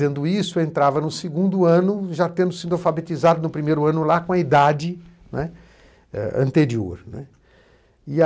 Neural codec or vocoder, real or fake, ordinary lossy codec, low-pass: none; real; none; none